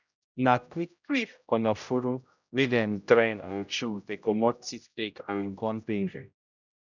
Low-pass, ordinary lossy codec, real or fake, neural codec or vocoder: 7.2 kHz; none; fake; codec, 16 kHz, 0.5 kbps, X-Codec, HuBERT features, trained on general audio